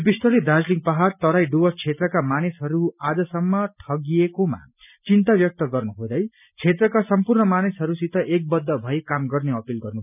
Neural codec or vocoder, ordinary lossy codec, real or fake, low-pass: none; none; real; 3.6 kHz